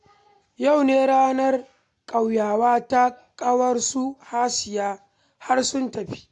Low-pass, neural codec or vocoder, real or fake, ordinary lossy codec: 10.8 kHz; none; real; AAC, 64 kbps